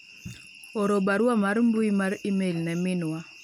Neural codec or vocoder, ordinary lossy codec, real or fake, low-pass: none; none; real; 19.8 kHz